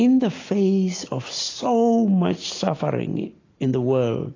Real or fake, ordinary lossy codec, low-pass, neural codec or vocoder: real; AAC, 32 kbps; 7.2 kHz; none